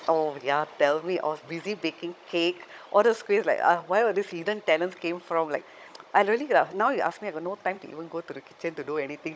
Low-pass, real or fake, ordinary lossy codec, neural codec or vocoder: none; fake; none; codec, 16 kHz, 16 kbps, FunCodec, trained on Chinese and English, 50 frames a second